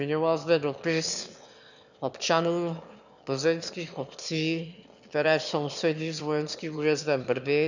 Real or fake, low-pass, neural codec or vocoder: fake; 7.2 kHz; autoencoder, 22.05 kHz, a latent of 192 numbers a frame, VITS, trained on one speaker